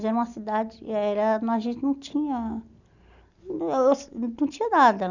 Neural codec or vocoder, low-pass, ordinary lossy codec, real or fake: none; 7.2 kHz; none; real